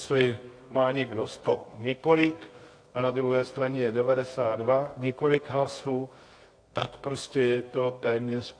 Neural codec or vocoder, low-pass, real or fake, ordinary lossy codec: codec, 24 kHz, 0.9 kbps, WavTokenizer, medium music audio release; 9.9 kHz; fake; AAC, 48 kbps